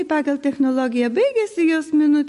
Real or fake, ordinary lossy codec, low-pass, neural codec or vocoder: real; MP3, 48 kbps; 14.4 kHz; none